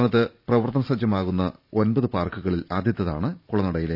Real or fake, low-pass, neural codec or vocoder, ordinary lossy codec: fake; 5.4 kHz; vocoder, 44.1 kHz, 128 mel bands every 512 samples, BigVGAN v2; none